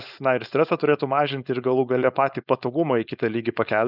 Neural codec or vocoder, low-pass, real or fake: codec, 16 kHz, 4.8 kbps, FACodec; 5.4 kHz; fake